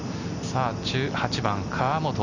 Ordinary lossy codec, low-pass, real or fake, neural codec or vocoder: none; 7.2 kHz; real; none